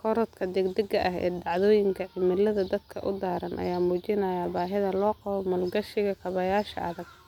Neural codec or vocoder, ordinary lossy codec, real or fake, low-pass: none; none; real; 19.8 kHz